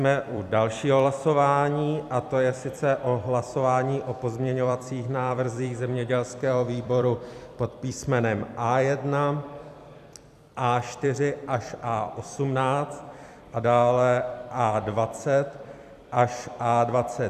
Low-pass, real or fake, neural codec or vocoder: 14.4 kHz; fake; vocoder, 44.1 kHz, 128 mel bands every 256 samples, BigVGAN v2